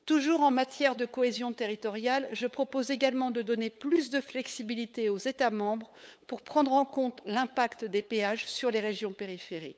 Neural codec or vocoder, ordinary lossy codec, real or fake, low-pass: codec, 16 kHz, 8 kbps, FunCodec, trained on LibriTTS, 25 frames a second; none; fake; none